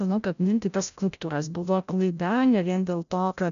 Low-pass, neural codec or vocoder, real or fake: 7.2 kHz; codec, 16 kHz, 0.5 kbps, FreqCodec, larger model; fake